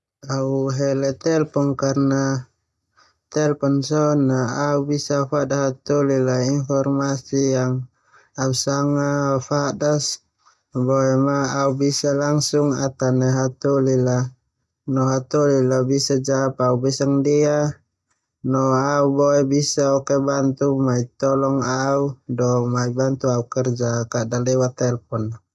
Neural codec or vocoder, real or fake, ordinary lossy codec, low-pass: none; real; none; none